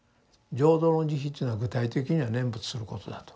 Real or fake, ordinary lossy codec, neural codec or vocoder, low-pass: real; none; none; none